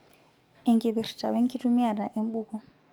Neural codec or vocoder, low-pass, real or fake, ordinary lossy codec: codec, 44.1 kHz, 7.8 kbps, DAC; 19.8 kHz; fake; MP3, 96 kbps